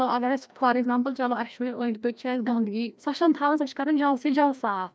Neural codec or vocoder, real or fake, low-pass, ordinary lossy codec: codec, 16 kHz, 1 kbps, FreqCodec, larger model; fake; none; none